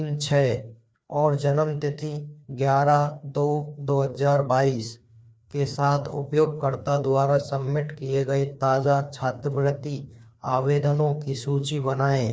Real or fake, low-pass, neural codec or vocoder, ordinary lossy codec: fake; none; codec, 16 kHz, 2 kbps, FreqCodec, larger model; none